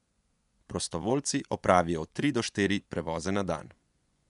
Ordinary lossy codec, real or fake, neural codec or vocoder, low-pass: none; fake; vocoder, 24 kHz, 100 mel bands, Vocos; 10.8 kHz